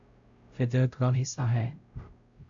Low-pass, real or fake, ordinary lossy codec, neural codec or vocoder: 7.2 kHz; fake; Opus, 64 kbps; codec, 16 kHz, 0.5 kbps, X-Codec, WavLM features, trained on Multilingual LibriSpeech